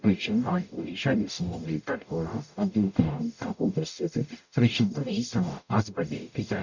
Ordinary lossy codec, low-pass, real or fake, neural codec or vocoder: none; 7.2 kHz; fake; codec, 44.1 kHz, 0.9 kbps, DAC